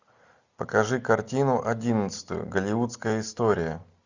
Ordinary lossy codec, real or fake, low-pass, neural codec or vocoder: Opus, 64 kbps; real; 7.2 kHz; none